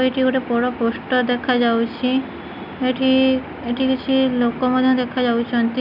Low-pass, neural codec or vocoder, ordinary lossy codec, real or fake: 5.4 kHz; none; none; real